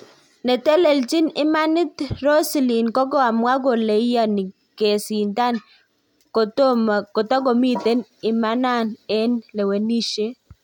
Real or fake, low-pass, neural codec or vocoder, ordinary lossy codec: real; 19.8 kHz; none; none